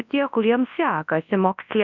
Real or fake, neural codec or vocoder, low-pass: fake; codec, 24 kHz, 0.9 kbps, WavTokenizer, large speech release; 7.2 kHz